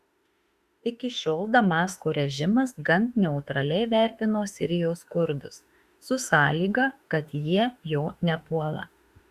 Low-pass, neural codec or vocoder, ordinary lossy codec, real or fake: 14.4 kHz; autoencoder, 48 kHz, 32 numbers a frame, DAC-VAE, trained on Japanese speech; Opus, 64 kbps; fake